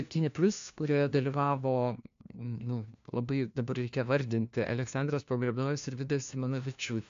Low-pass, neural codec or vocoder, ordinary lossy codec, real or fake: 7.2 kHz; codec, 16 kHz, 1 kbps, FunCodec, trained on LibriTTS, 50 frames a second; AAC, 64 kbps; fake